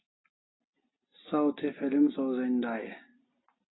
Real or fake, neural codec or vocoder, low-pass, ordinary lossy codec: real; none; 7.2 kHz; AAC, 16 kbps